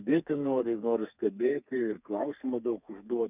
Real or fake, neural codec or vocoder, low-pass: fake; codec, 32 kHz, 1.9 kbps, SNAC; 3.6 kHz